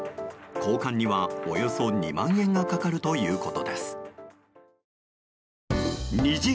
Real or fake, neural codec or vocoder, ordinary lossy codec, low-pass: real; none; none; none